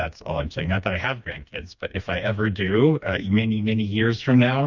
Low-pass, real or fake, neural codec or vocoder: 7.2 kHz; fake; codec, 16 kHz, 2 kbps, FreqCodec, smaller model